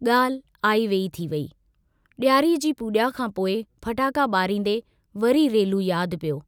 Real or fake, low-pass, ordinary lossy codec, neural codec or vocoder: real; none; none; none